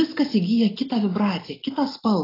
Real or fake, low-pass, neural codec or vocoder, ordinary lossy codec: real; 5.4 kHz; none; AAC, 24 kbps